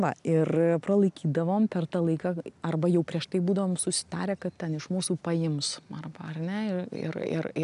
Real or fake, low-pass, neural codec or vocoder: real; 10.8 kHz; none